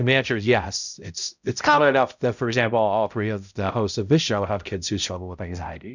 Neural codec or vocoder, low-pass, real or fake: codec, 16 kHz, 0.5 kbps, X-Codec, HuBERT features, trained on balanced general audio; 7.2 kHz; fake